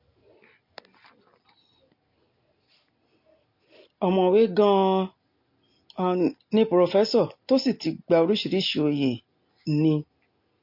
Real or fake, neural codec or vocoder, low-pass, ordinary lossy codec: real; none; 5.4 kHz; MP3, 32 kbps